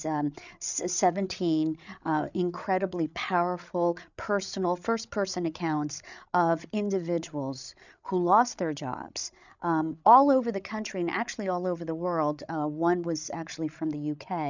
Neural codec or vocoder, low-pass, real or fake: codec, 16 kHz, 8 kbps, FreqCodec, larger model; 7.2 kHz; fake